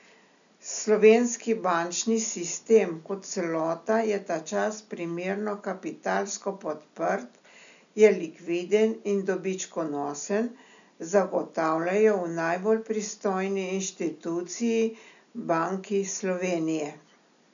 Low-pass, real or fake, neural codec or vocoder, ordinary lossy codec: 7.2 kHz; real; none; none